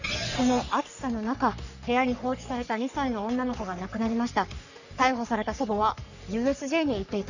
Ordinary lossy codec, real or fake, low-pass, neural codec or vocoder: none; fake; 7.2 kHz; codec, 44.1 kHz, 3.4 kbps, Pupu-Codec